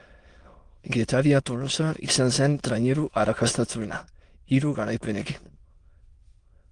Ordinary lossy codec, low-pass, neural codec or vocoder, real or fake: Opus, 24 kbps; 9.9 kHz; autoencoder, 22.05 kHz, a latent of 192 numbers a frame, VITS, trained on many speakers; fake